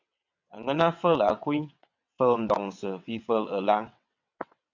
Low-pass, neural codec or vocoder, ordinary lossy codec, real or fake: 7.2 kHz; vocoder, 22.05 kHz, 80 mel bands, WaveNeXt; MP3, 64 kbps; fake